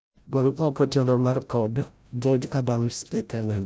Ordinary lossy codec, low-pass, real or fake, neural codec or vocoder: none; none; fake; codec, 16 kHz, 0.5 kbps, FreqCodec, larger model